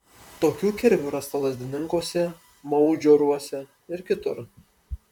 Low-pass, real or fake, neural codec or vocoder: 19.8 kHz; fake; vocoder, 44.1 kHz, 128 mel bands, Pupu-Vocoder